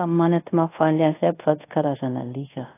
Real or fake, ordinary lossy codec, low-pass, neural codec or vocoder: fake; AAC, 24 kbps; 3.6 kHz; codec, 24 kHz, 0.5 kbps, DualCodec